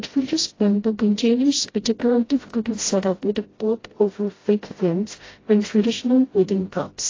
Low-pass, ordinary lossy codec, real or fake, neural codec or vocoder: 7.2 kHz; AAC, 32 kbps; fake; codec, 16 kHz, 0.5 kbps, FreqCodec, smaller model